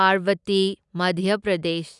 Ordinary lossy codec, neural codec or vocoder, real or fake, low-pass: none; none; real; 10.8 kHz